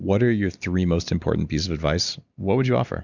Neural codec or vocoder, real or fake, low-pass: none; real; 7.2 kHz